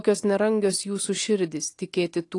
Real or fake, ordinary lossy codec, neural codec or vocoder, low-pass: real; AAC, 48 kbps; none; 10.8 kHz